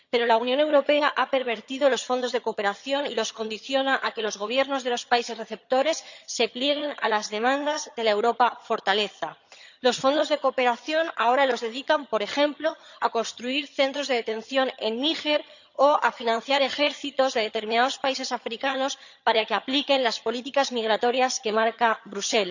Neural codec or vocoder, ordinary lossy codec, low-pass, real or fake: vocoder, 22.05 kHz, 80 mel bands, HiFi-GAN; none; 7.2 kHz; fake